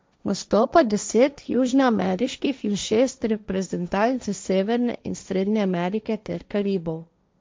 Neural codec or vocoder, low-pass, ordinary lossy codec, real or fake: codec, 16 kHz, 1.1 kbps, Voila-Tokenizer; none; none; fake